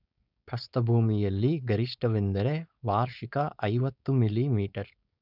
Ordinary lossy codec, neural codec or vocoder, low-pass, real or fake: none; codec, 16 kHz, 4.8 kbps, FACodec; 5.4 kHz; fake